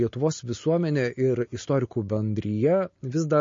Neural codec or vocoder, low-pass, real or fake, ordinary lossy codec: none; 7.2 kHz; real; MP3, 32 kbps